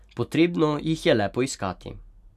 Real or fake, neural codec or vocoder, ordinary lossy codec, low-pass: real; none; none; 14.4 kHz